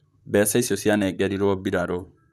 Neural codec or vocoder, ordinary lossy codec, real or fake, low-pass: vocoder, 44.1 kHz, 128 mel bands, Pupu-Vocoder; none; fake; 14.4 kHz